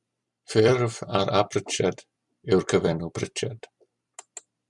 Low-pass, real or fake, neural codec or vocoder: 10.8 kHz; fake; vocoder, 44.1 kHz, 128 mel bands every 256 samples, BigVGAN v2